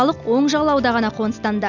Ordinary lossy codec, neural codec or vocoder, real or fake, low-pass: none; none; real; 7.2 kHz